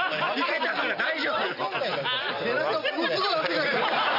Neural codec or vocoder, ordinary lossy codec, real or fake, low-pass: none; none; real; 5.4 kHz